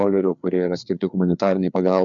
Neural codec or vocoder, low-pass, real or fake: codec, 16 kHz, 4 kbps, FreqCodec, larger model; 7.2 kHz; fake